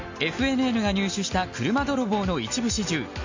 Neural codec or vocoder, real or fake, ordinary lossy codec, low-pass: none; real; MP3, 48 kbps; 7.2 kHz